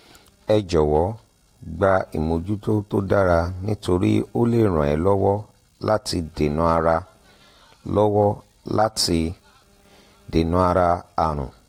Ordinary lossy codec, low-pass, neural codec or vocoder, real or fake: AAC, 48 kbps; 19.8 kHz; none; real